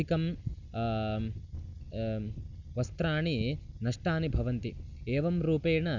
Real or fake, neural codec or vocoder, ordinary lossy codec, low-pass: real; none; Opus, 64 kbps; 7.2 kHz